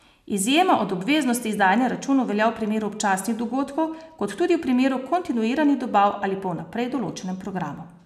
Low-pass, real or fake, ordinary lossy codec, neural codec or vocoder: 14.4 kHz; real; none; none